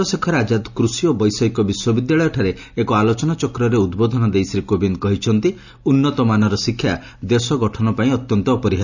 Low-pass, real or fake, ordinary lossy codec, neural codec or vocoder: 7.2 kHz; real; none; none